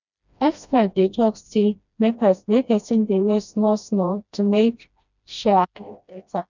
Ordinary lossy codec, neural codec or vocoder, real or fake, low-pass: none; codec, 16 kHz, 1 kbps, FreqCodec, smaller model; fake; 7.2 kHz